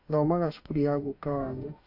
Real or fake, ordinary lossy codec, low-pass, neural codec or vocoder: fake; AAC, 32 kbps; 5.4 kHz; autoencoder, 48 kHz, 32 numbers a frame, DAC-VAE, trained on Japanese speech